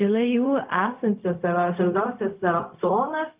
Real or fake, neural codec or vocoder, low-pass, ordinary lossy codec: fake; codec, 16 kHz, 0.4 kbps, LongCat-Audio-Codec; 3.6 kHz; Opus, 24 kbps